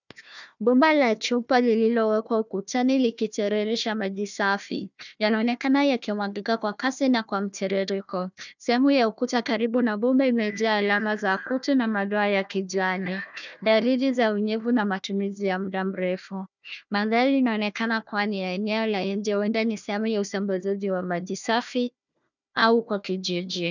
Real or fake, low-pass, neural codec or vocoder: fake; 7.2 kHz; codec, 16 kHz, 1 kbps, FunCodec, trained on Chinese and English, 50 frames a second